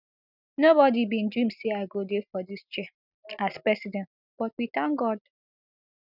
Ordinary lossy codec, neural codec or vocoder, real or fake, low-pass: none; none; real; 5.4 kHz